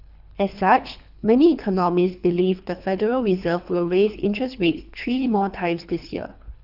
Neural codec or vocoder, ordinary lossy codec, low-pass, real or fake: codec, 24 kHz, 3 kbps, HILCodec; none; 5.4 kHz; fake